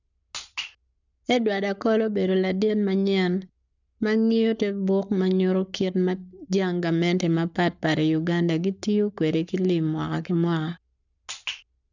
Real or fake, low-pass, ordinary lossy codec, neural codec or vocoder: fake; 7.2 kHz; none; codec, 44.1 kHz, 7.8 kbps, Pupu-Codec